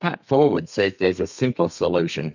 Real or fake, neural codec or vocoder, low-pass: fake; codec, 32 kHz, 1.9 kbps, SNAC; 7.2 kHz